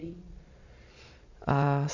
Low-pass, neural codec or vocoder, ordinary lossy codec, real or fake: 7.2 kHz; vocoder, 22.05 kHz, 80 mel bands, Vocos; none; fake